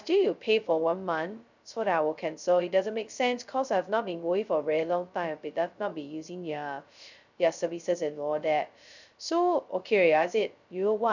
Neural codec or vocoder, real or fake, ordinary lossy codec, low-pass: codec, 16 kHz, 0.2 kbps, FocalCodec; fake; none; 7.2 kHz